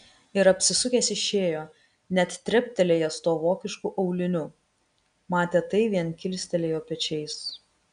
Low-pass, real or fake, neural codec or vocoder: 9.9 kHz; real; none